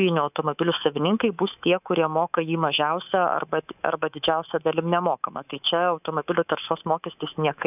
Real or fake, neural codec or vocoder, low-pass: fake; codec, 24 kHz, 3.1 kbps, DualCodec; 3.6 kHz